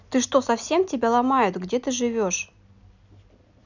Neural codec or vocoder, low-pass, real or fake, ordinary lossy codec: none; 7.2 kHz; real; none